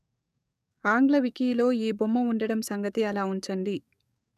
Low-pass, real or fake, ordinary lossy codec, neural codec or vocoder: 14.4 kHz; fake; none; codec, 44.1 kHz, 7.8 kbps, DAC